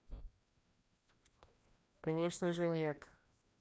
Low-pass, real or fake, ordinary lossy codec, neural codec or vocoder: none; fake; none; codec, 16 kHz, 1 kbps, FreqCodec, larger model